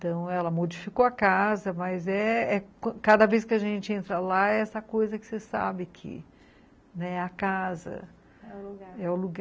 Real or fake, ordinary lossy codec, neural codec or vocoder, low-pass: real; none; none; none